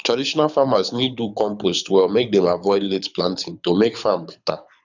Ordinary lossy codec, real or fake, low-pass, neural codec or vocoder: none; fake; 7.2 kHz; codec, 24 kHz, 6 kbps, HILCodec